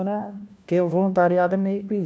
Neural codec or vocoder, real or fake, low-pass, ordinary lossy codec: codec, 16 kHz, 1 kbps, FunCodec, trained on LibriTTS, 50 frames a second; fake; none; none